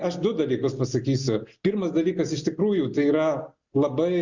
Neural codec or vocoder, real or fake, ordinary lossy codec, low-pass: none; real; Opus, 64 kbps; 7.2 kHz